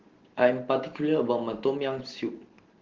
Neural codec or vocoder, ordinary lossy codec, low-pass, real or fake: none; Opus, 16 kbps; 7.2 kHz; real